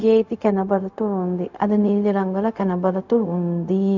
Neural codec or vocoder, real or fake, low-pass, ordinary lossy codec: codec, 16 kHz, 0.4 kbps, LongCat-Audio-Codec; fake; 7.2 kHz; none